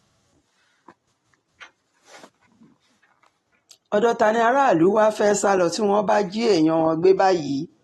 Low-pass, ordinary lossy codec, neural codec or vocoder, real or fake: 19.8 kHz; AAC, 32 kbps; none; real